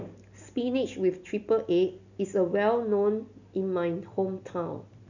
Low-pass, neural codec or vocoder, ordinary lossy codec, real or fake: 7.2 kHz; none; none; real